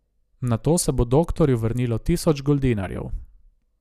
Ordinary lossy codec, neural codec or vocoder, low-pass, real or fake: none; none; 14.4 kHz; real